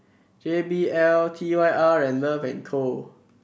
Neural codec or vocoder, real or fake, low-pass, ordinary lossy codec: none; real; none; none